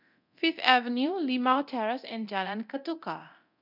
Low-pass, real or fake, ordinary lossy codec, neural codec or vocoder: 5.4 kHz; fake; none; codec, 24 kHz, 0.9 kbps, DualCodec